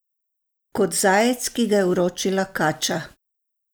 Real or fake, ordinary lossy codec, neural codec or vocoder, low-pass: real; none; none; none